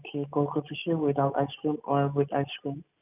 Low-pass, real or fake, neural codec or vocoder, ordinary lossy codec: 3.6 kHz; real; none; none